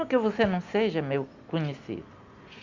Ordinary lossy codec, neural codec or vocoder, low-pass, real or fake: Opus, 64 kbps; none; 7.2 kHz; real